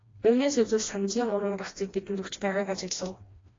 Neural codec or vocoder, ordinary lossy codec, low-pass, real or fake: codec, 16 kHz, 1 kbps, FreqCodec, smaller model; AAC, 32 kbps; 7.2 kHz; fake